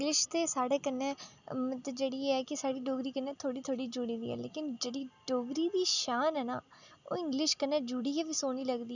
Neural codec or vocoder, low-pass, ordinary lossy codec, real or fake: none; 7.2 kHz; none; real